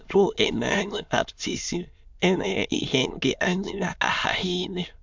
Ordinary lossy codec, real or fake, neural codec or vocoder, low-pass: MP3, 64 kbps; fake; autoencoder, 22.05 kHz, a latent of 192 numbers a frame, VITS, trained on many speakers; 7.2 kHz